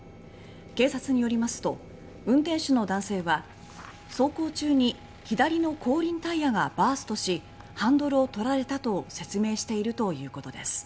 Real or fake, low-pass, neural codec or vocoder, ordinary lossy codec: real; none; none; none